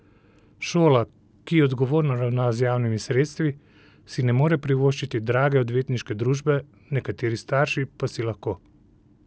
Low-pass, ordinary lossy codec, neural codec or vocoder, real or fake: none; none; none; real